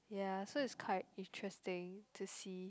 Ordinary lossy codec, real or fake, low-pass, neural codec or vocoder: none; real; none; none